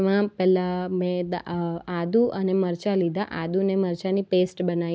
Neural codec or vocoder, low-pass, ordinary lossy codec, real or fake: none; none; none; real